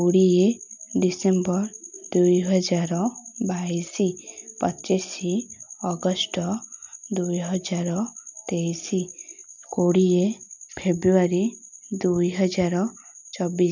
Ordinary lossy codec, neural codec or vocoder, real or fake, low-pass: MP3, 64 kbps; none; real; 7.2 kHz